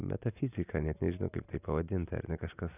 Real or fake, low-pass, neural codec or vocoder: real; 3.6 kHz; none